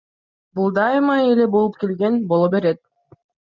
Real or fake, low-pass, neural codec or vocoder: real; 7.2 kHz; none